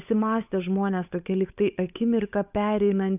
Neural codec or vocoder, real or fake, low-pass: codec, 16 kHz, 8 kbps, FunCodec, trained on Chinese and English, 25 frames a second; fake; 3.6 kHz